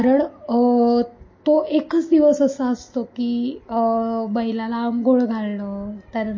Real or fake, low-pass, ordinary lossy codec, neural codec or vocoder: real; 7.2 kHz; MP3, 32 kbps; none